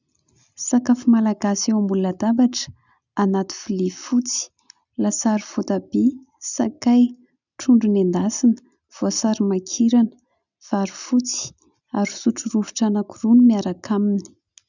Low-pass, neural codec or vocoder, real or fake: 7.2 kHz; none; real